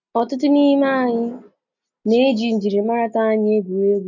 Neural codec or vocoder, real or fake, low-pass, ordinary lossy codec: none; real; 7.2 kHz; none